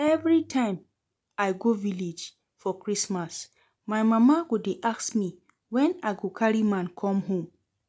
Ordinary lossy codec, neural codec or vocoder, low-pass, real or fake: none; none; none; real